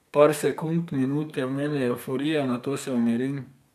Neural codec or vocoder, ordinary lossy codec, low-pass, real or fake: codec, 32 kHz, 1.9 kbps, SNAC; none; 14.4 kHz; fake